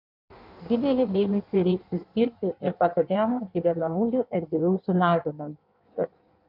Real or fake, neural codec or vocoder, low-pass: fake; codec, 16 kHz in and 24 kHz out, 1.1 kbps, FireRedTTS-2 codec; 5.4 kHz